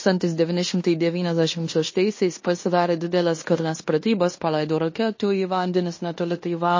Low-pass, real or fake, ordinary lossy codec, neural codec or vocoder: 7.2 kHz; fake; MP3, 32 kbps; codec, 16 kHz in and 24 kHz out, 0.9 kbps, LongCat-Audio-Codec, fine tuned four codebook decoder